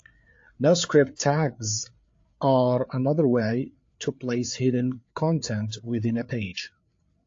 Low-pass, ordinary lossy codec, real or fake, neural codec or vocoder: 7.2 kHz; AAC, 48 kbps; fake; codec, 16 kHz, 4 kbps, FreqCodec, larger model